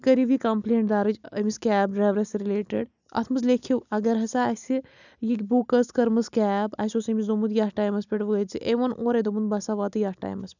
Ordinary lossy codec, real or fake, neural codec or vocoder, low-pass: none; real; none; 7.2 kHz